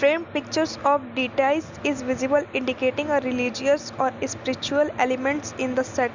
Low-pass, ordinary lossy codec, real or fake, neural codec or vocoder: 7.2 kHz; Opus, 64 kbps; real; none